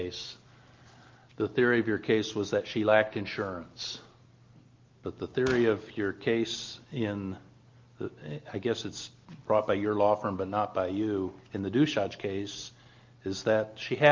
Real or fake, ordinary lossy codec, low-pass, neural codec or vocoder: real; Opus, 32 kbps; 7.2 kHz; none